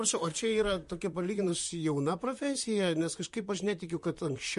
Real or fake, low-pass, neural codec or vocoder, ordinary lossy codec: fake; 14.4 kHz; vocoder, 44.1 kHz, 128 mel bands, Pupu-Vocoder; MP3, 48 kbps